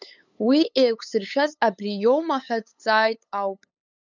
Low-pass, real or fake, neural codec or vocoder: 7.2 kHz; fake; codec, 16 kHz, 8 kbps, FunCodec, trained on LibriTTS, 25 frames a second